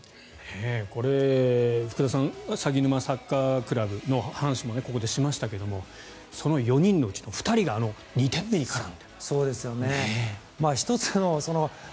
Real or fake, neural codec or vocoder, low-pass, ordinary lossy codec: real; none; none; none